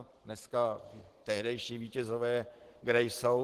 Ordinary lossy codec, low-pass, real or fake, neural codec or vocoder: Opus, 16 kbps; 14.4 kHz; fake; vocoder, 44.1 kHz, 128 mel bands every 512 samples, BigVGAN v2